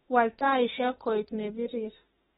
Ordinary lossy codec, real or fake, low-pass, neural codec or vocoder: AAC, 16 kbps; fake; 19.8 kHz; autoencoder, 48 kHz, 32 numbers a frame, DAC-VAE, trained on Japanese speech